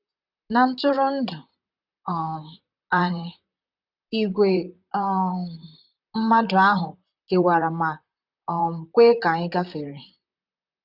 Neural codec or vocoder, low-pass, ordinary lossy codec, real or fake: vocoder, 44.1 kHz, 128 mel bands, Pupu-Vocoder; 5.4 kHz; none; fake